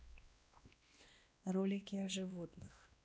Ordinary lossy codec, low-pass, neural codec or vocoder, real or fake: none; none; codec, 16 kHz, 1 kbps, X-Codec, WavLM features, trained on Multilingual LibriSpeech; fake